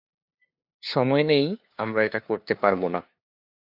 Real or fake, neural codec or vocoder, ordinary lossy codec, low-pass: fake; codec, 16 kHz, 2 kbps, FunCodec, trained on LibriTTS, 25 frames a second; AAC, 48 kbps; 5.4 kHz